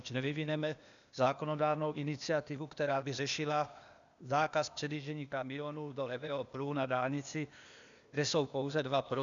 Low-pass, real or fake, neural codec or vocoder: 7.2 kHz; fake; codec, 16 kHz, 0.8 kbps, ZipCodec